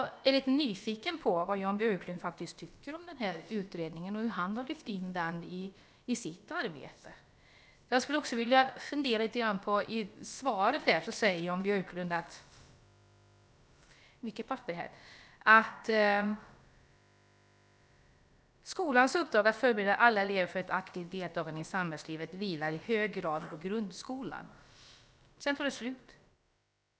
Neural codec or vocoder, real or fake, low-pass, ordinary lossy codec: codec, 16 kHz, about 1 kbps, DyCAST, with the encoder's durations; fake; none; none